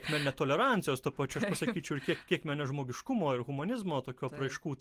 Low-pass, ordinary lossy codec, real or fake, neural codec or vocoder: 14.4 kHz; Opus, 24 kbps; real; none